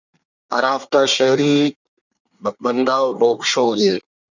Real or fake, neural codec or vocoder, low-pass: fake; codec, 24 kHz, 1 kbps, SNAC; 7.2 kHz